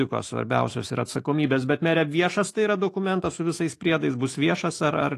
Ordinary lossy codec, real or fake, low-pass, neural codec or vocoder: AAC, 48 kbps; fake; 14.4 kHz; autoencoder, 48 kHz, 128 numbers a frame, DAC-VAE, trained on Japanese speech